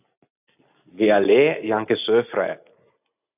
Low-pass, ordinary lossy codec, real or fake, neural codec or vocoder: 3.6 kHz; AAC, 32 kbps; real; none